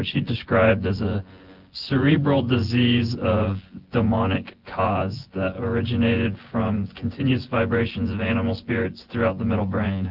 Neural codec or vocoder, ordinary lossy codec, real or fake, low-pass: vocoder, 24 kHz, 100 mel bands, Vocos; Opus, 16 kbps; fake; 5.4 kHz